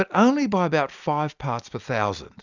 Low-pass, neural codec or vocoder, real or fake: 7.2 kHz; none; real